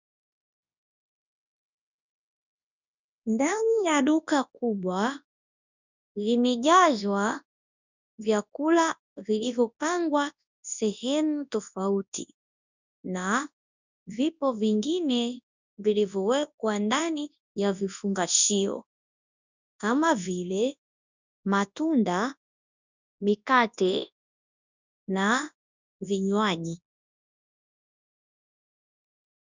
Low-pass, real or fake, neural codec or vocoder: 7.2 kHz; fake; codec, 24 kHz, 0.9 kbps, WavTokenizer, large speech release